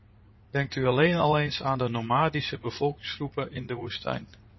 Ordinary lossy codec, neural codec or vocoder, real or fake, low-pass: MP3, 24 kbps; vocoder, 44.1 kHz, 80 mel bands, Vocos; fake; 7.2 kHz